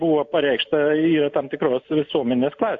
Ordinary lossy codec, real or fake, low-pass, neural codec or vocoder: AAC, 48 kbps; real; 7.2 kHz; none